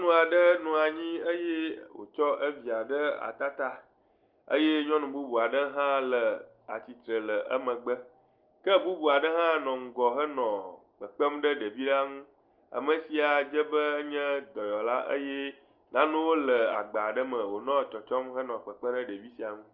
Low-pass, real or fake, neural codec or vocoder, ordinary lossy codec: 5.4 kHz; real; none; Opus, 24 kbps